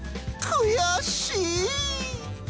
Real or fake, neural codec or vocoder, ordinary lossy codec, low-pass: real; none; none; none